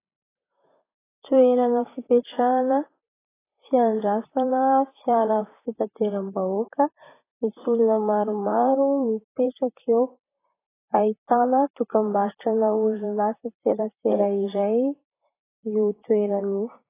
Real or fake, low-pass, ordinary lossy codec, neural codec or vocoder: fake; 3.6 kHz; AAC, 16 kbps; vocoder, 44.1 kHz, 128 mel bands, Pupu-Vocoder